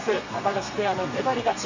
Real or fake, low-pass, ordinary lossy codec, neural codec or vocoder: fake; 7.2 kHz; MP3, 64 kbps; codec, 32 kHz, 1.9 kbps, SNAC